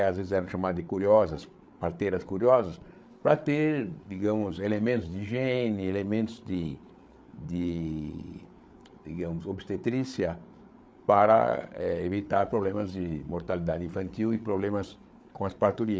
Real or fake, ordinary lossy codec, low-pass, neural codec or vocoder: fake; none; none; codec, 16 kHz, 8 kbps, FreqCodec, larger model